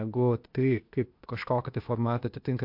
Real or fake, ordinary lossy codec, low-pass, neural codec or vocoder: fake; MP3, 48 kbps; 5.4 kHz; codec, 16 kHz, 0.8 kbps, ZipCodec